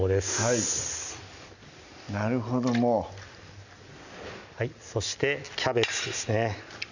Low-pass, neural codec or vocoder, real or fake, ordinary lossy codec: 7.2 kHz; none; real; none